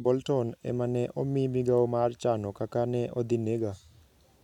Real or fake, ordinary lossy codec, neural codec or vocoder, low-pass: real; none; none; 19.8 kHz